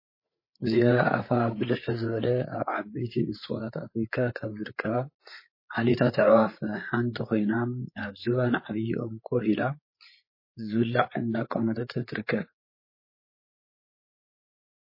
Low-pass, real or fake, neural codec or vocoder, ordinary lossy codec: 5.4 kHz; fake; codec, 16 kHz, 8 kbps, FreqCodec, larger model; MP3, 24 kbps